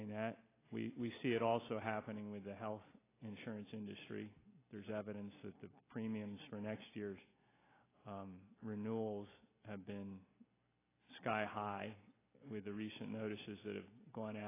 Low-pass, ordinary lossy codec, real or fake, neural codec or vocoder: 3.6 kHz; AAC, 16 kbps; real; none